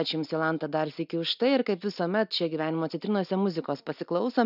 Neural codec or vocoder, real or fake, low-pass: none; real; 5.4 kHz